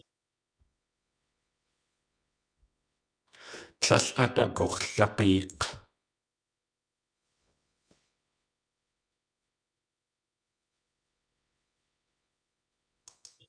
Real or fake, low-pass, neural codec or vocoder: fake; 9.9 kHz; codec, 24 kHz, 0.9 kbps, WavTokenizer, medium music audio release